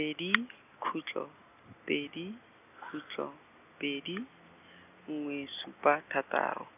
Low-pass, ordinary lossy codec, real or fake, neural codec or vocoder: 3.6 kHz; none; real; none